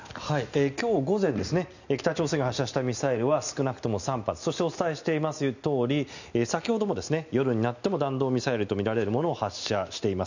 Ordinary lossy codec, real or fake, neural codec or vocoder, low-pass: none; real; none; 7.2 kHz